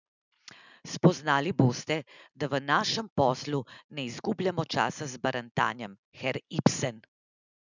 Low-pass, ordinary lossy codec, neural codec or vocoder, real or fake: 7.2 kHz; none; none; real